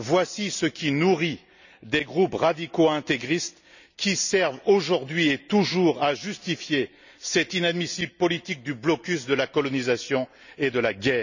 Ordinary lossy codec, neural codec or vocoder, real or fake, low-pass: none; none; real; 7.2 kHz